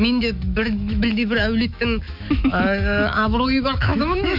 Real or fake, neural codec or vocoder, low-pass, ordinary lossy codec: fake; autoencoder, 48 kHz, 128 numbers a frame, DAC-VAE, trained on Japanese speech; 5.4 kHz; none